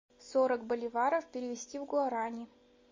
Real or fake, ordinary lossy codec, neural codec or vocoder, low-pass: real; MP3, 32 kbps; none; 7.2 kHz